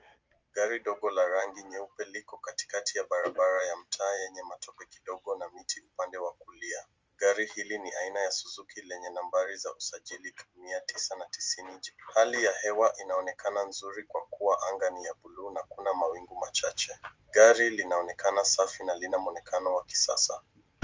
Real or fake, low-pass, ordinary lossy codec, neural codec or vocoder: real; 7.2 kHz; Opus, 32 kbps; none